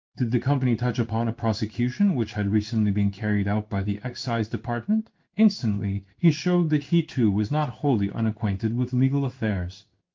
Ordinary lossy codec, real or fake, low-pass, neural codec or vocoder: Opus, 32 kbps; fake; 7.2 kHz; codec, 16 kHz in and 24 kHz out, 1 kbps, XY-Tokenizer